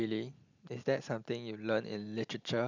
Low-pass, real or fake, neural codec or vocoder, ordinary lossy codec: 7.2 kHz; real; none; none